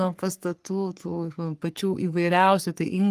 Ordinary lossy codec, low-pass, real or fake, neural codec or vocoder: Opus, 32 kbps; 14.4 kHz; fake; codec, 44.1 kHz, 3.4 kbps, Pupu-Codec